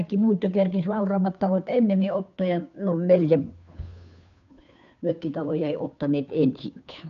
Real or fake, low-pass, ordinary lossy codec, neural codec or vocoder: fake; 7.2 kHz; none; codec, 16 kHz, 2 kbps, FunCodec, trained on Chinese and English, 25 frames a second